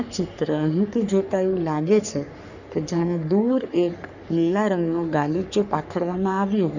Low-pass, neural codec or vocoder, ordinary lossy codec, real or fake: 7.2 kHz; codec, 44.1 kHz, 3.4 kbps, Pupu-Codec; none; fake